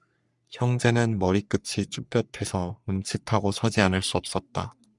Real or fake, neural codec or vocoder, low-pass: fake; codec, 44.1 kHz, 3.4 kbps, Pupu-Codec; 10.8 kHz